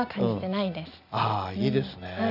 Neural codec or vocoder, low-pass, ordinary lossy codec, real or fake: none; 5.4 kHz; none; real